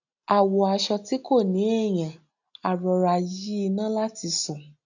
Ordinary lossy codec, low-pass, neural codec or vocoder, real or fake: none; 7.2 kHz; none; real